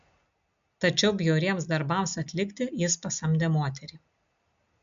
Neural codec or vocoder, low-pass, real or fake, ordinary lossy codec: none; 7.2 kHz; real; MP3, 64 kbps